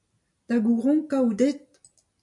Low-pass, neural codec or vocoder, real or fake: 10.8 kHz; none; real